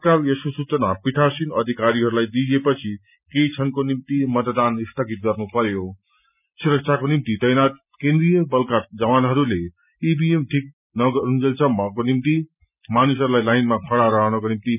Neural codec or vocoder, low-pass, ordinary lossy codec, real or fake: none; 3.6 kHz; none; real